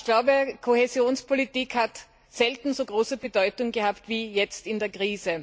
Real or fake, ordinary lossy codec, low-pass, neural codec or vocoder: real; none; none; none